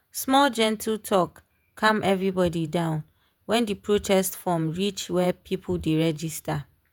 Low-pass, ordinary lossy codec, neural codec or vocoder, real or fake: none; none; vocoder, 48 kHz, 128 mel bands, Vocos; fake